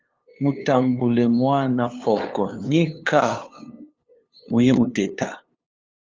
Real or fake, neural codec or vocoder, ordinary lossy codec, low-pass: fake; codec, 16 kHz, 2 kbps, FunCodec, trained on LibriTTS, 25 frames a second; Opus, 32 kbps; 7.2 kHz